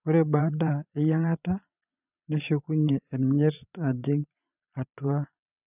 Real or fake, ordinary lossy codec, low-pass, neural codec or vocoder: fake; none; 3.6 kHz; vocoder, 22.05 kHz, 80 mel bands, Vocos